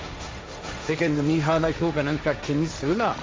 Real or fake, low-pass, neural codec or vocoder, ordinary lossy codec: fake; none; codec, 16 kHz, 1.1 kbps, Voila-Tokenizer; none